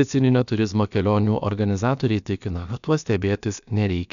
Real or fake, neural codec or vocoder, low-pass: fake; codec, 16 kHz, about 1 kbps, DyCAST, with the encoder's durations; 7.2 kHz